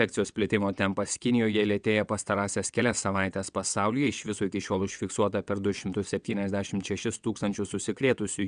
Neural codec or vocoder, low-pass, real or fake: vocoder, 22.05 kHz, 80 mel bands, WaveNeXt; 9.9 kHz; fake